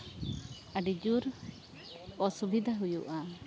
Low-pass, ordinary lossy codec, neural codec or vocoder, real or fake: none; none; none; real